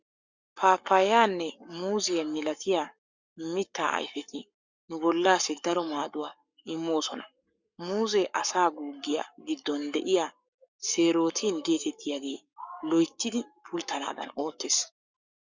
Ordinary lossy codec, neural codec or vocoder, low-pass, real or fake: Opus, 64 kbps; codec, 44.1 kHz, 7.8 kbps, Pupu-Codec; 7.2 kHz; fake